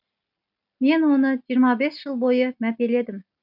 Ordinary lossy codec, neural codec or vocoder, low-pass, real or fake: none; none; 5.4 kHz; real